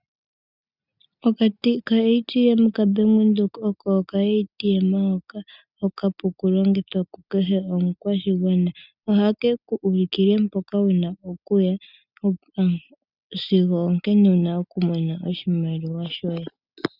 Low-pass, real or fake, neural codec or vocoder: 5.4 kHz; real; none